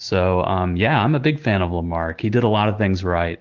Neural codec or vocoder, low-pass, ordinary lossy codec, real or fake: none; 7.2 kHz; Opus, 16 kbps; real